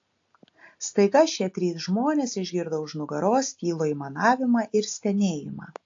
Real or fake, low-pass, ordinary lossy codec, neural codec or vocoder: real; 7.2 kHz; AAC, 48 kbps; none